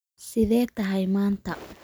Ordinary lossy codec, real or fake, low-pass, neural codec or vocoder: none; real; none; none